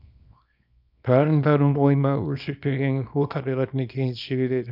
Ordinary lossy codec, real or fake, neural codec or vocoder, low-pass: none; fake; codec, 24 kHz, 0.9 kbps, WavTokenizer, small release; 5.4 kHz